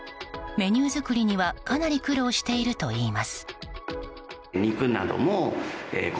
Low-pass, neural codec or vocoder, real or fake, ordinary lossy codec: none; none; real; none